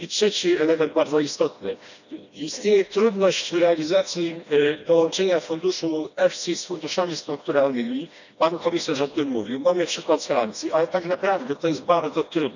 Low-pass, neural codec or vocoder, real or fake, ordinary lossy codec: 7.2 kHz; codec, 16 kHz, 1 kbps, FreqCodec, smaller model; fake; none